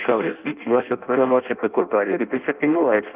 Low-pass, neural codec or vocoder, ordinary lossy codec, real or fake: 3.6 kHz; codec, 16 kHz in and 24 kHz out, 0.6 kbps, FireRedTTS-2 codec; Opus, 24 kbps; fake